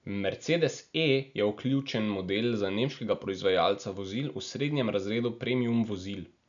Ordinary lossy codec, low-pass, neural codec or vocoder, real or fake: none; 7.2 kHz; none; real